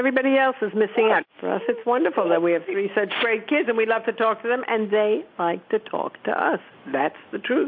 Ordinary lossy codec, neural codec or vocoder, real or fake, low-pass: MP3, 48 kbps; none; real; 5.4 kHz